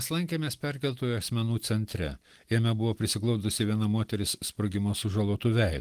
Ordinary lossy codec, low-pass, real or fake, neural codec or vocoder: Opus, 24 kbps; 14.4 kHz; real; none